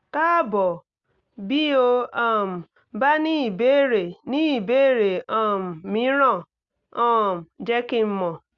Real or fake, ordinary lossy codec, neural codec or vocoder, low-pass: real; Opus, 64 kbps; none; 7.2 kHz